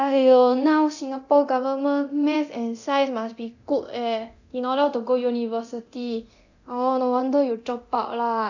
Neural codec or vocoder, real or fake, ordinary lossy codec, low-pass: codec, 24 kHz, 0.9 kbps, DualCodec; fake; none; 7.2 kHz